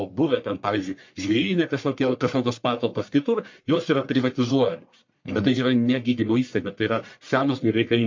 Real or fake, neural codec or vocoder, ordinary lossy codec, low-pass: fake; codec, 44.1 kHz, 1.7 kbps, Pupu-Codec; MP3, 48 kbps; 7.2 kHz